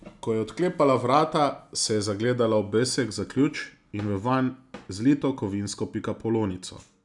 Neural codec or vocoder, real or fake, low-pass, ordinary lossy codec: none; real; 10.8 kHz; none